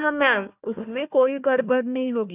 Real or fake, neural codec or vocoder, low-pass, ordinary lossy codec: fake; codec, 16 kHz, 1 kbps, FunCodec, trained on Chinese and English, 50 frames a second; 3.6 kHz; none